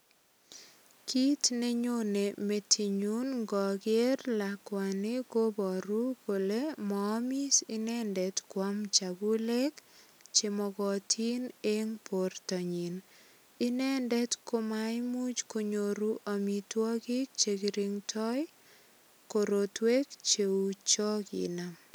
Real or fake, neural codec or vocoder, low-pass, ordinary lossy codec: real; none; none; none